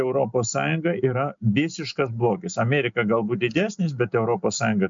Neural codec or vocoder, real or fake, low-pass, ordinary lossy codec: none; real; 7.2 kHz; MP3, 64 kbps